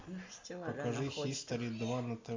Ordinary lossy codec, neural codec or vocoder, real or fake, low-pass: MP3, 64 kbps; none; real; 7.2 kHz